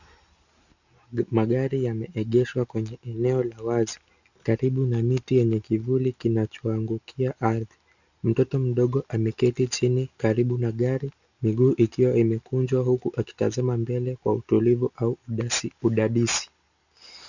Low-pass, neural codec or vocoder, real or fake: 7.2 kHz; none; real